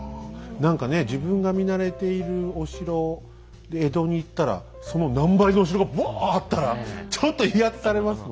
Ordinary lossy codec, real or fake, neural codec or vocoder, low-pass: none; real; none; none